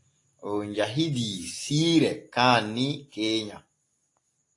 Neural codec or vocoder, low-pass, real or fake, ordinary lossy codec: none; 10.8 kHz; real; AAC, 48 kbps